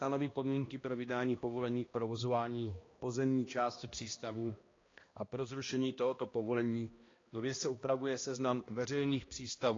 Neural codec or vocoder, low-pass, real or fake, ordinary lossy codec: codec, 16 kHz, 1 kbps, X-Codec, HuBERT features, trained on balanced general audio; 7.2 kHz; fake; AAC, 32 kbps